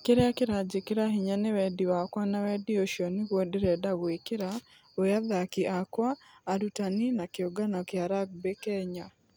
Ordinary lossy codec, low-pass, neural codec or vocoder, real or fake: none; none; none; real